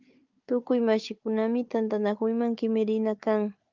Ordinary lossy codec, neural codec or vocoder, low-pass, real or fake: Opus, 24 kbps; codec, 16 kHz, 4 kbps, FunCodec, trained on Chinese and English, 50 frames a second; 7.2 kHz; fake